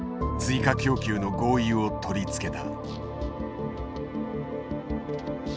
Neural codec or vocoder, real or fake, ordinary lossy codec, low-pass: none; real; none; none